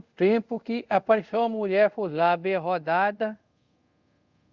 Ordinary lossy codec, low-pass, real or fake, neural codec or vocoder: Opus, 64 kbps; 7.2 kHz; fake; codec, 24 kHz, 0.5 kbps, DualCodec